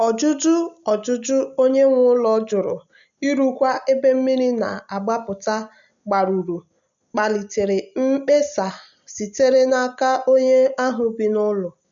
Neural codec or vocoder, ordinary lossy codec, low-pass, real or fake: none; none; 7.2 kHz; real